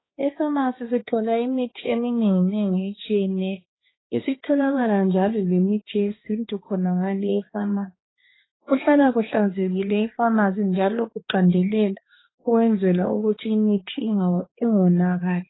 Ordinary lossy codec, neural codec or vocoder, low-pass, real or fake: AAC, 16 kbps; codec, 16 kHz, 2 kbps, X-Codec, HuBERT features, trained on balanced general audio; 7.2 kHz; fake